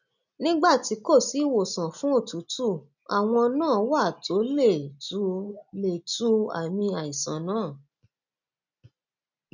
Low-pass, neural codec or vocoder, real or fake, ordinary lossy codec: 7.2 kHz; none; real; none